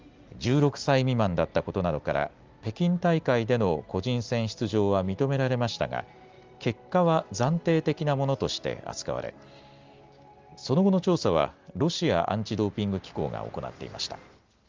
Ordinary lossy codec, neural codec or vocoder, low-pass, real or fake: Opus, 32 kbps; none; 7.2 kHz; real